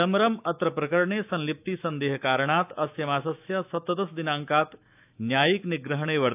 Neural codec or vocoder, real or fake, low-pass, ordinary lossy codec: autoencoder, 48 kHz, 128 numbers a frame, DAC-VAE, trained on Japanese speech; fake; 3.6 kHz; AAC, 32 kbps